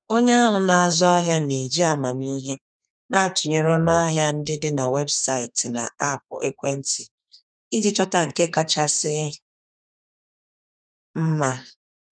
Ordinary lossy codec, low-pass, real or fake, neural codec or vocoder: none; 9.9 kHz; fake; codec, 32 kHz, 1.9 kbps, SNAC